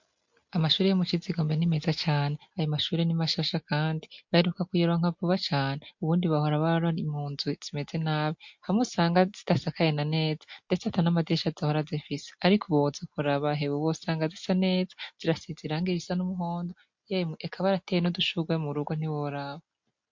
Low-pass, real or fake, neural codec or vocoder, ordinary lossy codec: 7.2 kHz; real; none; MP3, 48 kbps